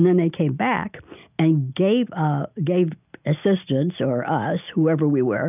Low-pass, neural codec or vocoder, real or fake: 3.6 kHz; none; real